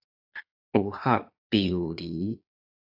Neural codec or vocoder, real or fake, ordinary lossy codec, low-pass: vocoder, 22.05 kHz, 80 mel bands, Vocos; fake; AAC, 48 kbps; 5.4 kHz